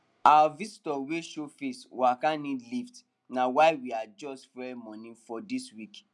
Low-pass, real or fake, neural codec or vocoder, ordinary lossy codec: none; real; none; none